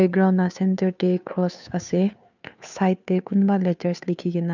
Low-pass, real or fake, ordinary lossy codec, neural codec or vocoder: 7.2 kHz; fake; none; codec, 16 kHz, 2 kbps, FunCodec, trained on Chinese and English, 25 frames a second